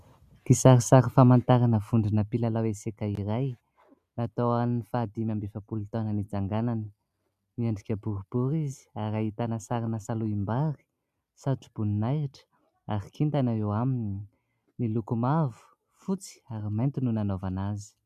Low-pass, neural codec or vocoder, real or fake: 14.4 kHz; none; real